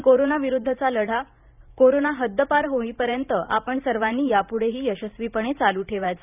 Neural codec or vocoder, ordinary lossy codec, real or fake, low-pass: vocoder, 44.1 kHz, 128 mel bands every 512 samples, BigVGAN v2; none; fake; 3.6 kHz